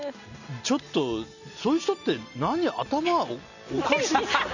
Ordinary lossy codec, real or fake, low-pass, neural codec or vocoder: none; real; 7.2 kHz; none